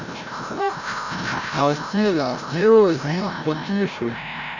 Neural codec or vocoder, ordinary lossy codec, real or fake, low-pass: codec, 16 kHz, 0.5 kbps, FreqCodec, larger model; none; fake; 7.2 kHz